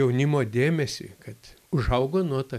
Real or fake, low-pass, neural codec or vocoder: real; 14.4 kHz; none